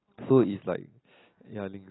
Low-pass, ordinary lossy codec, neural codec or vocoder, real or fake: 7.2 kHz; AAC, 16 kbps; none; real